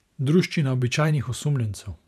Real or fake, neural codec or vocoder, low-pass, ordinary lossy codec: fake; vocoder, 44.1 kHz, 128 mel bands every 512 samples, BigVGAN v2; 14.4 kHz; none